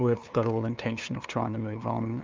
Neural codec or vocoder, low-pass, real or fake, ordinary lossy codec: codec, 16 kHz, 2 kbps, FunCodec, trained on LibriTTS, 25 frames a second; 7.2 kHz; fake; Opus, 32 kbps